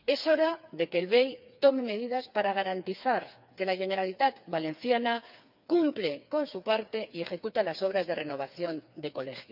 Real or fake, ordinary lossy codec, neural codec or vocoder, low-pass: fake; none; codec, 16 kHz, 4 kbps, FreqCodec, smaller model; 5.4 kHz